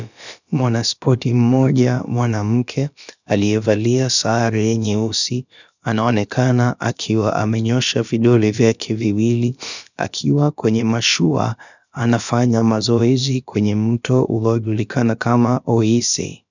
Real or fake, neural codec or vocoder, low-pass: fake; codec, 16 kHz, about 1 kbps, DyCAST, with the encoder's durations; 7.2 kHz